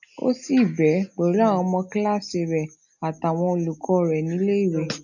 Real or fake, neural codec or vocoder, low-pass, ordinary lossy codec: real; none; 7.2 kHz; none